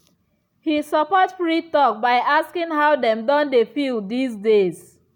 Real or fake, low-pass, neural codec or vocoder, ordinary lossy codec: real; 19.8 kHz; none; none